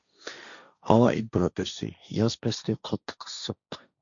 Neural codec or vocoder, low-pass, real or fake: codec, 16 kHz, 1.1 kbps, Voila-Tokenizer; 7.2 kHz; fake